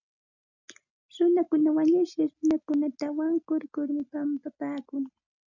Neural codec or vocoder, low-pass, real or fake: none; 7.2 kHz; real